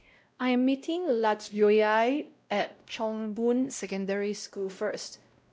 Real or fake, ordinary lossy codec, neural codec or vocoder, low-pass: fake; none; codec, 16 kHz, 0.5 kbps, X-Codec, WavLM features, trained on Multilingual LibriSpeech; none